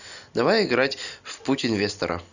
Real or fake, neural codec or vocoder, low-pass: real; none; 7.2 kHz